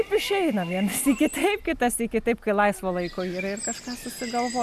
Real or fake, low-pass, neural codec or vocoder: real; 14.4 kHz; none